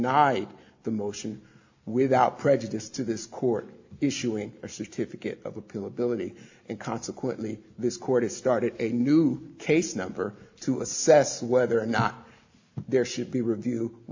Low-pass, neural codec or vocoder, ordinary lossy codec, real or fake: 7.2 kHz; none; AAC, 48 kbps; real